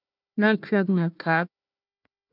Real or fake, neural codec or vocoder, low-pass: fake; codec, 16 kHz, 1 kbps, FunCodec, trained on Chinese and English, 50 frames a second; 5.4 kHz